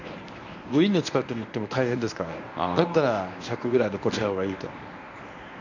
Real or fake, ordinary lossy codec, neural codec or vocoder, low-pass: fake; none; codec, 24 kHz, 0.9 kbps, WavTokenizer, medium speech release version 1; 7.2 kHz